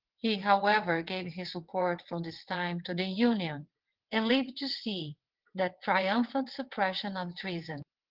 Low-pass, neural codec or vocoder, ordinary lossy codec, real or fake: 5.4 kHz; vocoder, 22.05 kHz, 80 mel bands, WaveNeXt; Opus, 16 kbps; fake